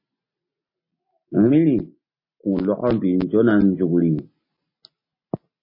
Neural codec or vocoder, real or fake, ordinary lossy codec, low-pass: none; real; MP3, 24 kbps; 5.4 kHz